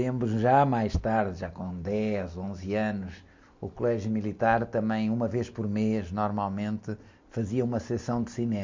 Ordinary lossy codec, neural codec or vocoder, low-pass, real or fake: MP3, 64 kbps; none; 7.2 kHz; real